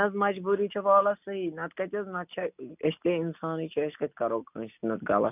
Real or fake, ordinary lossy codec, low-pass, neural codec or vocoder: real; none; 3.6 kHz; none